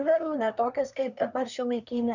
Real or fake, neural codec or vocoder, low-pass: fake; codec, 24 kHz, 1 kbps, SNAC; 7.2 kHz